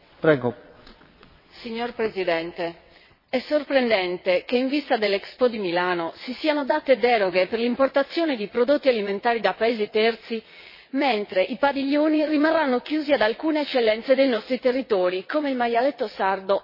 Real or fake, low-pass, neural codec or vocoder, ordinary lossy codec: fake; 5.4 kHz; vocoder, 22.05 kHz, 80 mel bands, WaveNeXt; MP3, 24 kbps